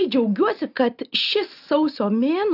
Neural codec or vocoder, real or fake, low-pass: none; real; 5.4 kHz